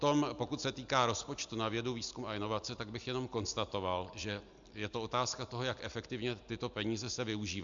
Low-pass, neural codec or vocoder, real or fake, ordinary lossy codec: 7.2 kHz; none; real; MP3, 64 kbps